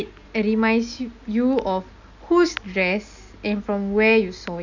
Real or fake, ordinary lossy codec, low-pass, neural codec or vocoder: real; none; 7.2 kHz; none